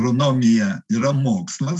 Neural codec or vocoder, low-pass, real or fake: none; 10.8 kHz; real